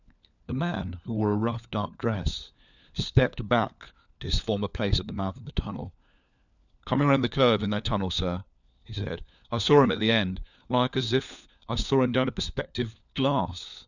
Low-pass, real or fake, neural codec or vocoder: 7.2 kHz; fake; codec, 16 kHz, 4 kbps, FunCodec, trained on LibriTTS, 50 frames a second